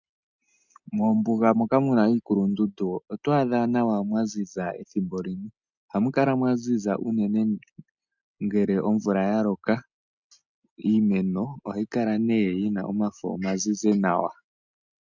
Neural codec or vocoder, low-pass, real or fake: none; 7.2 kHz; real